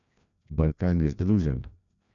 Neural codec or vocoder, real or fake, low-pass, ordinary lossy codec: codec, 16 kHz, 1 kbps, FreqCodec, larger model; fake; 7.2 kHz; none